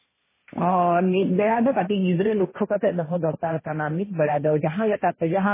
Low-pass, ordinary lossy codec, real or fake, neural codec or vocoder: 3.6 kHz; MP3, 16 kbps; fake; codec, 16 kHz, 1.1 kbps, Voila-Tokenizer